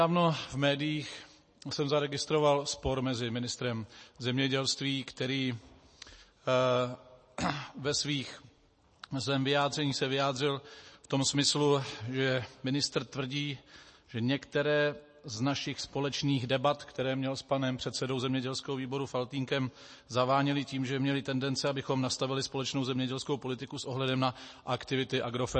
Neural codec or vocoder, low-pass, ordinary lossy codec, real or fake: none; 10.8 kHz; MP3, 32 kbps; real